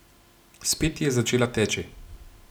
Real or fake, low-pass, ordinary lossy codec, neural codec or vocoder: real; none; none; none